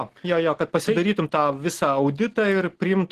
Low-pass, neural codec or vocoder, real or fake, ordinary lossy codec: 14.4 kHz; none; real; Opus, 16 kbps